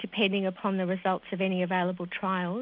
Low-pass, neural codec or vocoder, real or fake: 5.4 kHz; none; real